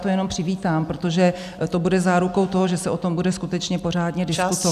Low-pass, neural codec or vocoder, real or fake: 14.4 kHz; none; real